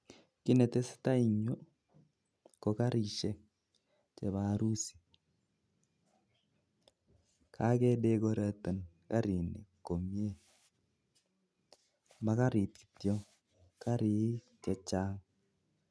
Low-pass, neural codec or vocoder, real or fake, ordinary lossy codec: none; none; real; none